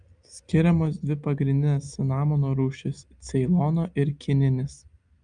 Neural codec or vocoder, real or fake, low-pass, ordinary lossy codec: none; real; 9.9 kHz; Opus, 32 kbps